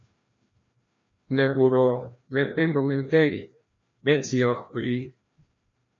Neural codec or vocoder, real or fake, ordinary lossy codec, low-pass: codec, 16 kHz, 1 kbps, FreqCodec, larger model; fake; MP3, 48 kbps; 7.2 kHz